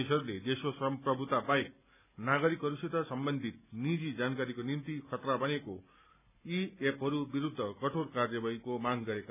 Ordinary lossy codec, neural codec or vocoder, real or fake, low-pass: none; none; real; 3.6 kHz